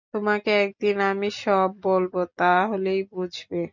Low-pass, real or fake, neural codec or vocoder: 7.2 kHz; real; none